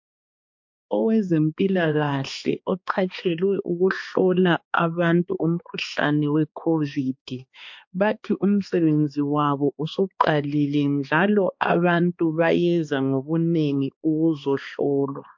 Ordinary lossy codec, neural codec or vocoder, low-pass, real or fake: MP3, 48 kbps; codec, 16 kHz, 2 kbps, X-Codec, HuBERT features, trained on balanced general audio; 7.2 kHz; fake